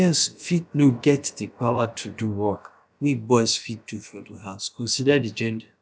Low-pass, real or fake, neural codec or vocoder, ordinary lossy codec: none; fake; codec, 16 kHz, about 1 kbps, DyCAST, with the encoder's durations; none